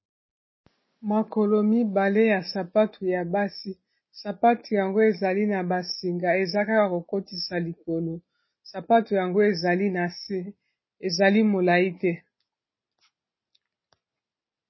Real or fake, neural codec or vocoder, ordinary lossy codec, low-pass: real; none; MP3, 24 kbps; 7.2 kHz